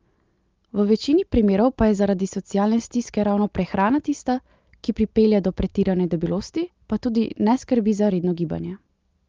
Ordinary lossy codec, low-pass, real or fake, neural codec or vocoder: Opus, 32 kbps; 7.2 kHz; real; none